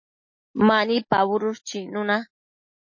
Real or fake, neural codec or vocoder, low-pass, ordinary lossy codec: real; none; 7.2 kHz; MP3, 32 kbps